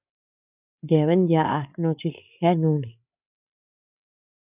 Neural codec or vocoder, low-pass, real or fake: codec, 16 kHz, 8 kbps, FreqCodec, larger model; 3.6 kHz; fake